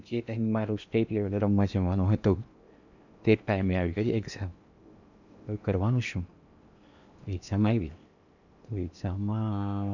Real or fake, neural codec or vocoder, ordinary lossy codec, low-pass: fake; codec, 16 kHz in and 24 kHz out, 0.8 kbps, FocalCodec, streaming, 65536 codes; none; 7.2 kHz